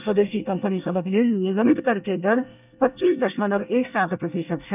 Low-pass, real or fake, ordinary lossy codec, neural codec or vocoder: 3.6 kHz; fake; none; codec, 24 kHz, 1 kbps, SNAC